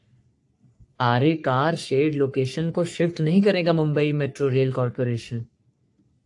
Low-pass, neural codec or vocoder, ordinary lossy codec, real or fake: 10.8 kHz; codec, 44.1 kHz, 3.4 kbps, Pupu-Codec; AAC, 64 kbps; fake